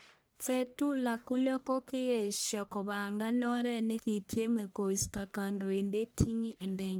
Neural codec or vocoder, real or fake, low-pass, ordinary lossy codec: codec, 44.1 kHz, 1.7 kbps, Pupu-Codec; fake; none; none